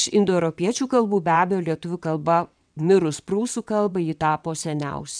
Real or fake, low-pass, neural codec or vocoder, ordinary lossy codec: fake; 9.9 kHz; vocoder, 22.05 kHz, 80 mel bands, WaveNeXt; MP3, 96 kbps